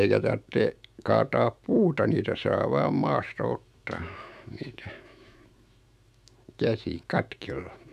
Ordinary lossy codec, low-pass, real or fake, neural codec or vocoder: none; 14.4 kHz; fake; autoencoder, 48 kHz, 128 numbers a frame, DAC-VAE, trained on Japanese speech